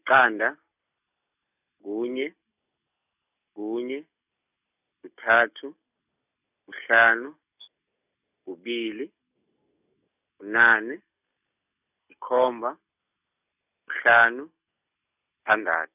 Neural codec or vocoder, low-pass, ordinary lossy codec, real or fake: none; 3.6 kHz; none; real